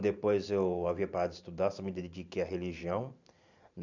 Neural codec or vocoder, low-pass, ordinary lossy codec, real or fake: vocoder, 44.1 kHz, 128 mel bands every 512 samples, BigVGAN v2; 7.2 kHz; none; fake